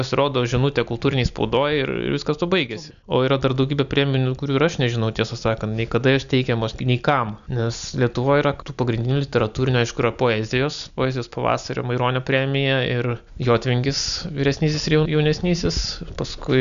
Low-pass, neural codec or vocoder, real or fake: 7.2 kHz; none; real